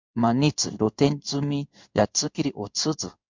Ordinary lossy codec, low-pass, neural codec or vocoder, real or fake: MP3, 64 kbps; 7.2 kHz; codec, 16 kHz in and 24 kHz out, 1 kbps, XY-Tokenizer; fake